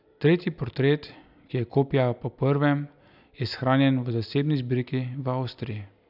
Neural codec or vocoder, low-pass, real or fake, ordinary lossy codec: none; 5.4 kHz; real; none